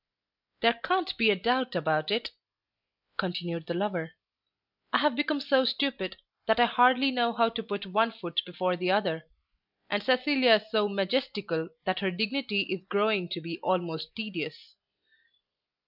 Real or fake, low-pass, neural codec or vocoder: real; 5.4 kHz; none